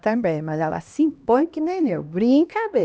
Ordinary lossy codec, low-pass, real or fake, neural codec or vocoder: none; none; fake; codec, 16 kHz, 1 kbps, X-Codec, HuBERT features, trained on LibriSpeech